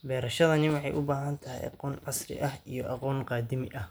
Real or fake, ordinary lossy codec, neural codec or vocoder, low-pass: real; none; none; none